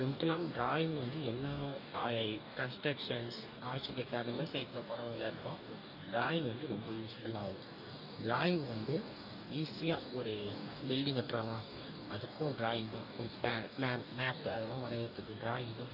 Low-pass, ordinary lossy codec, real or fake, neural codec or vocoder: 5.4 kHz; none; fake; codec, 44.1 kHz, 2.6 kbps, DAC